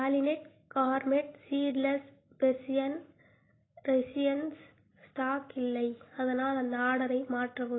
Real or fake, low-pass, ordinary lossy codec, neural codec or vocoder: real; 7.2 kHz; AAC, 16 kbps; none